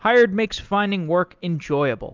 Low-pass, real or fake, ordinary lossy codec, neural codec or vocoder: 7.2 kHz; real; Opus, 24 kbps; none